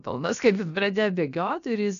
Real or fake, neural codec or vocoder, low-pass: fake; codec, 16 kHz, about 1 kbps, DyCAST, with the encoder's durations; 7.2 kHz